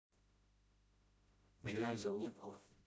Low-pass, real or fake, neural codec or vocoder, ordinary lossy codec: none; fake; codec, 16 kHz, 0.5 kbps, FreqCodec, smaller model; none